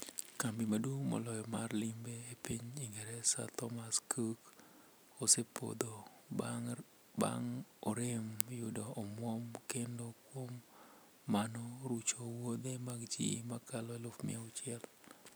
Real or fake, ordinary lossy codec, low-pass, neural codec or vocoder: real; none; none; none